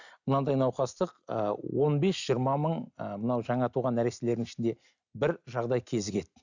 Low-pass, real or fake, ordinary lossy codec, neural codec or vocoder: 7.2 kHz; real; none; none